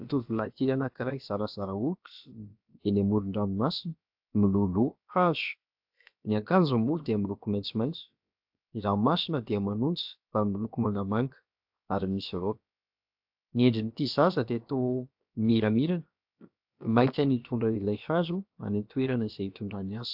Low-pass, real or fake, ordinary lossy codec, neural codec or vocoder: 5.4 kHz; fake; AAC, 48 kbps; codec, 16 kHz, about 1 kbps, DyCAST, with the encoder's durations